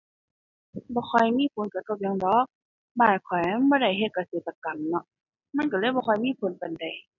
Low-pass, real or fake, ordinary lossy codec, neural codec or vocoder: 7.2 kHz; real; none; none